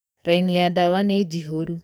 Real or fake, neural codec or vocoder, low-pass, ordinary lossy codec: fake; codec, 44.1 kHz, 2.6 kbps, SNAC; none; none